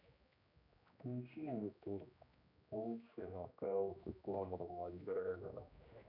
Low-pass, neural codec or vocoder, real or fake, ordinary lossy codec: 5.4 kHz; codec, 16 kHz, 1 kbps, X-Codec, HuBERT features, trained on general audio; fake; none